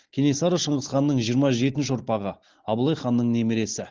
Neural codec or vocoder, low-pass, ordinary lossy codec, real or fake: none; 7.2 kHz; Opus, 16 kbps; real